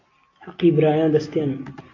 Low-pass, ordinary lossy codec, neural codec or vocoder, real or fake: 7.2 kHz; AAC, 32 kbps; none; real